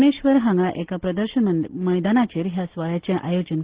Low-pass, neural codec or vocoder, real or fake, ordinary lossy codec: 3.6 kHz; none; real; Opus, 16 kbps